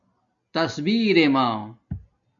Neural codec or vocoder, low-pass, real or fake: none; 7.2 kHz; real